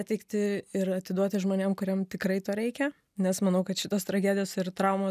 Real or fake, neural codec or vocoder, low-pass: real; none; 14.4 kHz